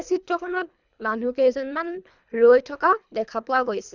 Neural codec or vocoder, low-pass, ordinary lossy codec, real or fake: codec, 24 kHz, 3 kbps, HILCodec; 7.2 kHz; none; fake